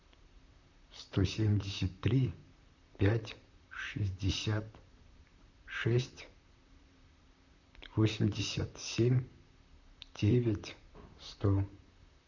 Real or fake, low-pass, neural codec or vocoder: fake; 7.2 kHz; vocoder, 44.1 kHz, 128 mel bands, Pupu-Vocoder